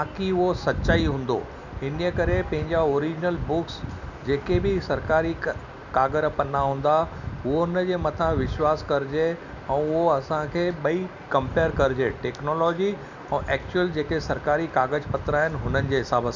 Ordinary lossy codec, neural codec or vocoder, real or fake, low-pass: none; none; real; 7.2 kHz